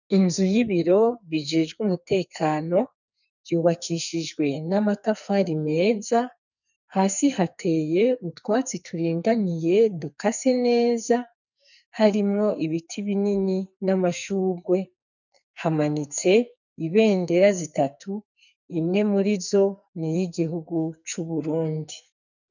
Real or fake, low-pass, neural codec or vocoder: fake; 7.2 kHz; codec, 44.1 kHz, 2.6 kbps, SNAC